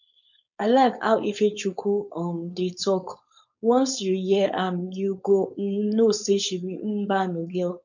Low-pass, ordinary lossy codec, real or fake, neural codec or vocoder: 7.2 kHz; none; fake; codec, 16 kHz, 4.8 kbps, FACodec